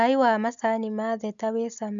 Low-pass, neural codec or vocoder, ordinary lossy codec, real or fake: 7.2 kHz; none; none; real